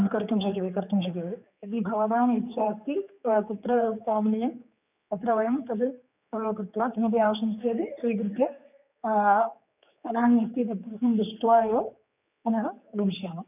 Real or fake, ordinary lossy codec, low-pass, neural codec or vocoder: fake; none; 3.6 kHz; codec, 16 kHz, 4 kbps, X-Codec, HuBERT features, trained on general audio